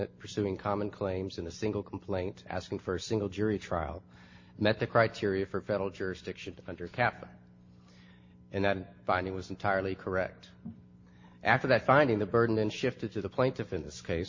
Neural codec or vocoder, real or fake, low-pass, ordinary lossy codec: none; real; 7.2 kHz; MP3, 32 kbps